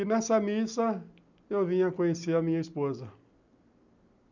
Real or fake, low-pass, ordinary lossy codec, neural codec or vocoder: real; 7.2 kHz; none; none